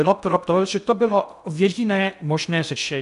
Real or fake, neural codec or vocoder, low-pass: fake; codec, 16 kHz in and 24 kHz out, 0.6 kbps, FocalCodec, streaming, 2048 codes; 10.8 kHz